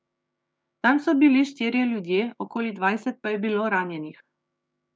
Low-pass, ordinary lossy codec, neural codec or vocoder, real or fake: none; none; codec, 16 kHz, 6 kbps, DAC; fake